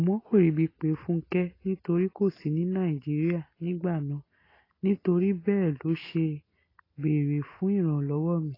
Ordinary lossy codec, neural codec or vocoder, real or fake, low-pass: AAC, 24 kbps; none; real; 5.4 kHz